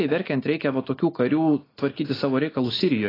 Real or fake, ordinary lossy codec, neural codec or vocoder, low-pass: real; AAC, 24 kbps; none; 5.4 kHz